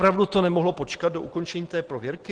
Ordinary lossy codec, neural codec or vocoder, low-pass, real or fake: Opus, 16 kbps; none; 9.9 kHz; real